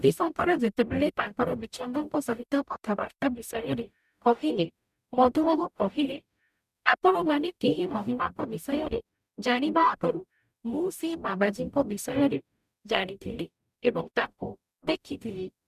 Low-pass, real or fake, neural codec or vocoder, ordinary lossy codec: 14.4 kHz; fake; codec, 44.1 kHz, 0.9 kbps, DAC; none